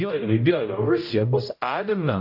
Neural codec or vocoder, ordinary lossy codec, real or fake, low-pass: codec, 16 kHz, 0.5 kbps, X-Codec, HuBERT features, trained on general audio; AAC, 48 kbps; fake; 5.4 kHz